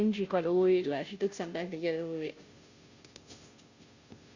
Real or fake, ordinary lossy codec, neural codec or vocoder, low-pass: fake; Opus, 64 kbps; codec, 16 kHz, 0.5 kbps, FunCodec, trained on Chinese and English, 25 frames a second; 7.2 kHz